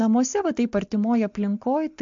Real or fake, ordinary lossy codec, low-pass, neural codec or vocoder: real; MP3, 48 kbps; 7.2 kHz; none